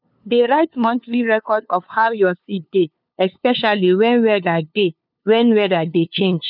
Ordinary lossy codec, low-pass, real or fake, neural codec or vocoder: none; 5.4 kHz; fake; codec, 16 kHz, 2 kbps, FunCodec, trained on LibriTTS, 25 frames a second